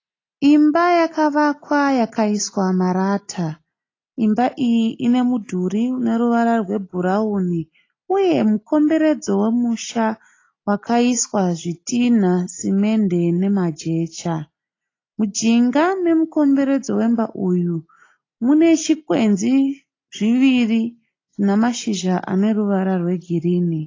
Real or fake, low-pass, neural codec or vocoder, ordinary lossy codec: real; 7.2 kHz; none; AAC, 32 kbps